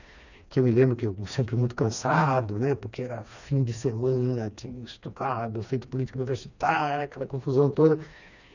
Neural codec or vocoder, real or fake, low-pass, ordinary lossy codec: codec, 16 kHz, 2 kbps, FreqCodec, smaller model; fake; 7.2 kHz; none